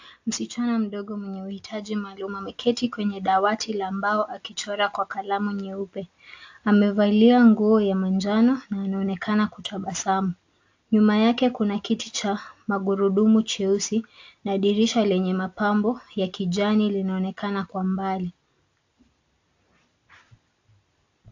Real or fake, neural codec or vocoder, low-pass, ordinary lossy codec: real; none; 7.2 kHz; AAC, 48 kbps